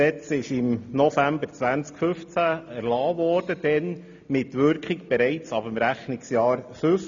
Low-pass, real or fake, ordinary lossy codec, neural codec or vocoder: 7.2 kHz; real; MP3, 64 kbps; none